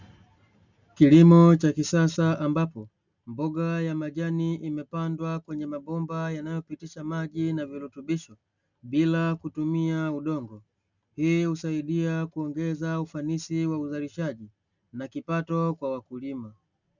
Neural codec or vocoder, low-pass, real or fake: none; 7.2 kHz; real